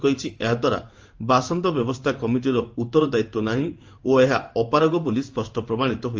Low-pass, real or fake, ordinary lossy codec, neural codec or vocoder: 7.2 kHz; fake; Opus, 24 kbps; codec, 16 kHz in and 24 kHz out, 1 kbps, XY-Tokenizer